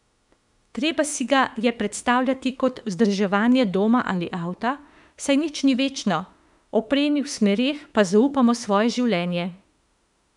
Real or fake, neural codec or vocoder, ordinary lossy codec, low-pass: fake; autoencoder, 48 kHz, 32 numbers a frame, DAC-VAE, trained on Japanese speech; none; 10.8 kHz